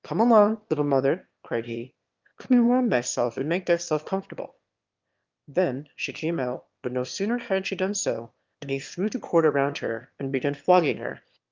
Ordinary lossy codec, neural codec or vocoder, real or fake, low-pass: Opus, 24 kbps; autoencoder, 22.05 kHz, a latent of 192 numbers a frame, VITS, trained on one speaker; fake; 7.2 kHz